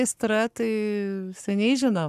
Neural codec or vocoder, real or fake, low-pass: codec, 44.1 kHz, 7.8 kbps, Pupu-Codec; fake; 14.4 kHz